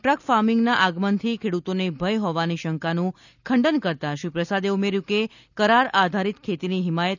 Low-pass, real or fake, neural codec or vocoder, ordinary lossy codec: 7.2 kHz; real; none; none